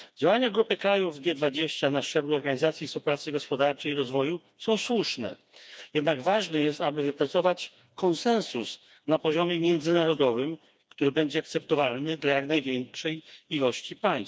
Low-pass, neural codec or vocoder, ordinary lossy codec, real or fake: none; codec, 16 kHz, 2 kbps, FreqCodec, smaller model; none; fake